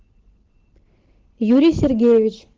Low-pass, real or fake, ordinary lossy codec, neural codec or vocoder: 7.2 kHz; real; Opus, 16 kbps; none